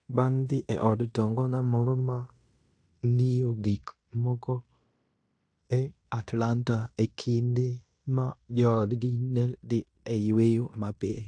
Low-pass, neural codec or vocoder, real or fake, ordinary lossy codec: 9.9 kHz; codec, 16 kHz in and 24 kHz out, 0.9 kbps, LongCat-Audio-Codec, fine tuned four codebook decoder; fake; AAC, 64 kbps